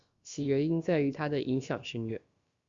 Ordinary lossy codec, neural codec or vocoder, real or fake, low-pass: Opus, 64 kbps; codec, 16 kHz, about 1 kbps, DyCAST, with the encoder's durations; fake; 7.2 kHz